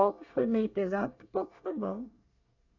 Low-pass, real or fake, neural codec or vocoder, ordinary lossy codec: 7.2 kHz; fake; codec, 24 kHz, 1 kbps, SNAC; none